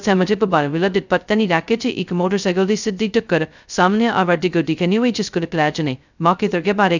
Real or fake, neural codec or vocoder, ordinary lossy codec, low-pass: fake; codec, 16 kHz, 0.2 kbps, FocalCodec; none; 7.2 kHz